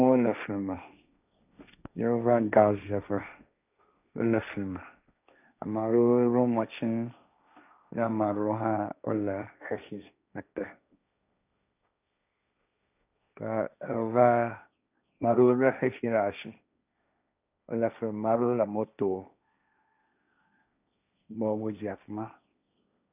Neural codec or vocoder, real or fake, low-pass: codec, 16 kHz, 1.1 kbps, Voila-Tokenizer; fake; 3.6 kHz